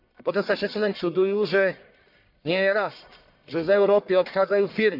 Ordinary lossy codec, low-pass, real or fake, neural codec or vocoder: none; 5.4 kHz; fake; codec, 44.1 kHz, 1.7 kbps, Pupu-Codec